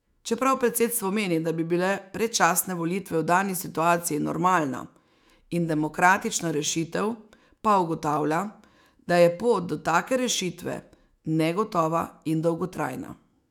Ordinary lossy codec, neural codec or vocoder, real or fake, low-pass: none; autoencoder, 48 kHz, 128 numbers a frame, DAC-VAE, trained on Japanese speech; fake; 19.8 kHz